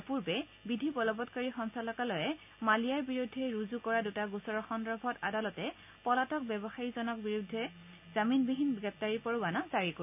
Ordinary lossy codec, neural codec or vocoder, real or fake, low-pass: AAC, 32 kbps; none; real; 3.6 kHz